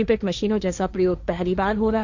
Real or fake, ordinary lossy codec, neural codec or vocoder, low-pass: fake; none; codec, 16 kHz, 1.1 kbps, Voila-Tokenizer; 7.2 kHz